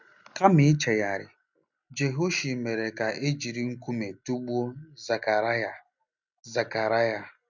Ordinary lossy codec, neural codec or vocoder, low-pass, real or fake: none; none; 7.2 kHz; real